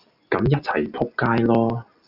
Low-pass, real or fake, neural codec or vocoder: 5.4 kHz; real; none